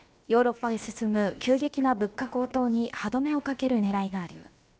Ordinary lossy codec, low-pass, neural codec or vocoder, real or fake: none; none; codec, 16 kHz, about 1 kbps, DyCAST, with the encoder's durations; fake